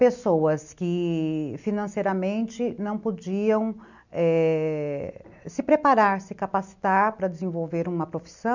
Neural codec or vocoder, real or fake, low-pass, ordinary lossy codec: none; real; 7.2 kHz; none